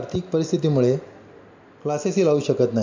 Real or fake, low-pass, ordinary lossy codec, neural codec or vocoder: real; 7.2 kHz; MP3, 64 kbps; none